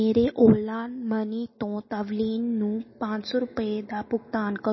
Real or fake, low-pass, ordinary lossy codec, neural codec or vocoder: real; 7.2 kHz; MP3, 24 kbps; none